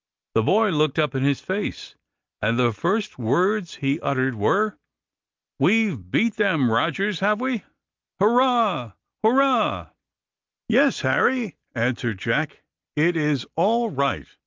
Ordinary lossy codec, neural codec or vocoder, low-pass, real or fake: Opus, 24 kbps; none; 7.2 kHz; real